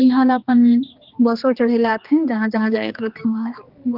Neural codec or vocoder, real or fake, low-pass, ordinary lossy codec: codec, 16 kHz, 2 kbps, X-Codec, HuBERT features, trained on general audio; fake; 5.4 kHz; Opus, 32 kbps